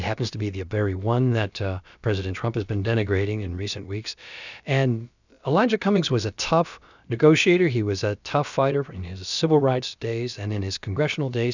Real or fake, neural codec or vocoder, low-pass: fake; codec, 16 kHz, about 1 kbps, DyCAST, with the encoder's durations; 7.2 kHz